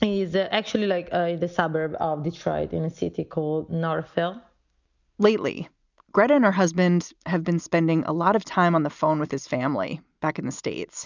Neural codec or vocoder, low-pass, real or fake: none; 7.2 kHz; real